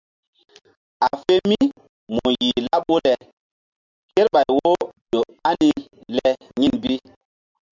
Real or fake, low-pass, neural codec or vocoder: real; 7.2 kHz; none